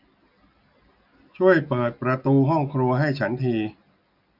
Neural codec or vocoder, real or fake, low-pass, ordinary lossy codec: none; real; 5.4 kHz; none